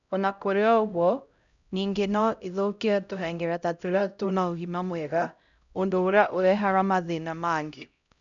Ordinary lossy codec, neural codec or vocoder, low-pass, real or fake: MP3, 96 kbps; codec, 16 kHz, 0.5 kbps, X-Codec, HuBERT features, trained on LibriSpeech; 7.2 kHz; fake